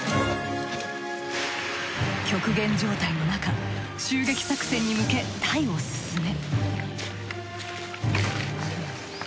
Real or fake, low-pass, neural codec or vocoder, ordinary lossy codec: real; none; none; none